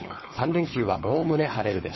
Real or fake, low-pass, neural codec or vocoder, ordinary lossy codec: fake; 7.2 kHz; codec, 16 kHz, 4.8 kbps, FACodec; MP3, 24 kbps